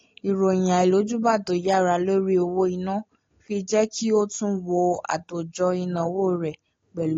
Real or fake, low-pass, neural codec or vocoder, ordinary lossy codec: real; 7.2 kHz; none; AAC, 32 kbps